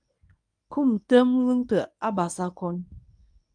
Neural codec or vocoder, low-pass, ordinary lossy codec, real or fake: codec, 24 kHz, 0.9 kbps, WavTokenizer, medium speech release version 1; 9.9 kHz; AAC, 64 kbps; fake